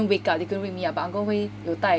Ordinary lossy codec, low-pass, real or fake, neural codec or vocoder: none; none; real; none